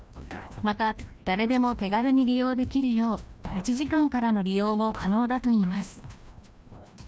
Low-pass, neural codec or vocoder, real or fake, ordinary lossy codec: none; codec, 16 kHz, 1 kbps, FreqCodec, larger model; fake; none